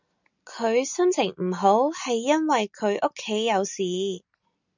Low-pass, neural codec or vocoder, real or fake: 7.2 kHz; none; real